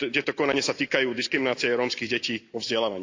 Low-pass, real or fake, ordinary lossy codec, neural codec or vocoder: 7.2 kHz; real; AAC, 48 kbps; none